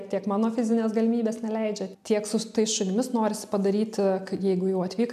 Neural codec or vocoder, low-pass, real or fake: none; 14.4 kHz; real